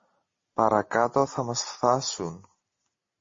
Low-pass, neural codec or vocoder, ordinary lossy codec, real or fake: 7.2 kHz; none; MP3, 32 kbps; real